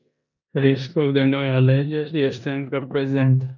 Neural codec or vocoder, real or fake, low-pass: codec, 16 kHz in and 24 kHz out, 0.9 kbps, LongCat-Audio-Codec, four codebook decoder; fake; 7.2 kHz